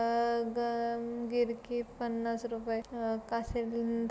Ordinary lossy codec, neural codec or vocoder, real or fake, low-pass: none; none; real; none